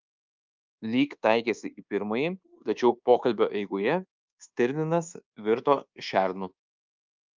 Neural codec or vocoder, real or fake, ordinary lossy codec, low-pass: codec, 24 kHz, 1.2 kbps, DualCodec; fake; Opus, 24 kbps; 7.2 kHz